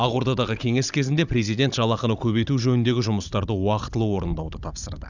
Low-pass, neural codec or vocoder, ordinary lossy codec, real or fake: 7.2 kHz; codec, 16 kHz, 16 kbps, FunCodec, trained on Chinese and English, 50 frames a second; none; fake